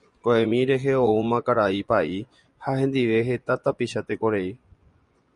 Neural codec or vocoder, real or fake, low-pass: vocoder, 24 kHz, 100 mel bands, Vocos; fake; 10.8 kHz